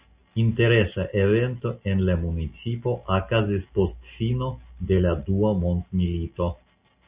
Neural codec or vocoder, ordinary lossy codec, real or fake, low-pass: none; Opus, 64 kbps; real; 3.6 kHz